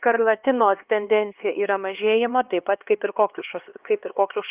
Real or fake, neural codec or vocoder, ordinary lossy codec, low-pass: fake; codec, 16 kHz, 2 kbps, X-Codec, HuBERT features, trained on LibriSpeech; Opus, 32 kbps; 3.6 kHz